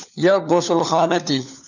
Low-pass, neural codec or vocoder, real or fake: 7.2 kHz; codec, 16 kHz, 4 kbps, FunCodec, trained on LibriTTS, 50 frames a second; fake